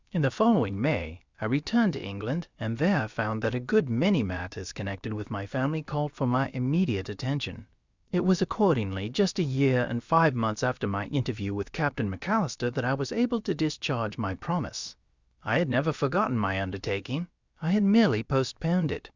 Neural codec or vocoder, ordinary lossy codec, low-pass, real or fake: codec, 16 kHz, about 1 kbps, DyCAST, with the encoder's durations; Opus, 64 kbps; 7.2 kHz; fake